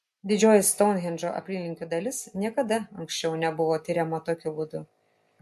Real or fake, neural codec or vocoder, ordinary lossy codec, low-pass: real; none; MP3, 64 kbps; 14.4 kHz